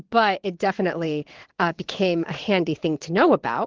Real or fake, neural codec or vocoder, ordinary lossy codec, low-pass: fake; vocoder, 22.05 kHz, 80 mel bands, WaveNeXt; Opus, 16 kbps; 7.2 kHz